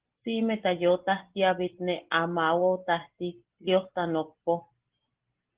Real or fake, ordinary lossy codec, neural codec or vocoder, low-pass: real; Opus, 16 kbps; none; 3.6 kHz